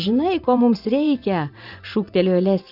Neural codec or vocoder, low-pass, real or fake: vocoder, 24 kHz, 100 mel bands, Vocos; 5.4 kHz; fake